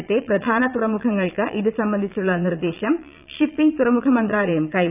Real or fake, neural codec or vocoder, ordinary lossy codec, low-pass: fake; vocoder, 22.05 kHz, 80 mel bands, Vocos; none; 3.6 kHz